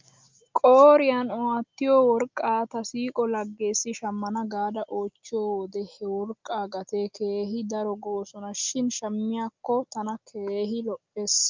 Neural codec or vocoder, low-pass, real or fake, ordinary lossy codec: none; 7.2 kHz; real; Opus, 24 kbps